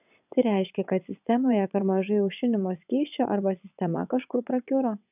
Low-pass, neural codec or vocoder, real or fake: 3.6 kHz; vocoder, 22.05 kHz, 80 mel bands, WaveNeXt; fake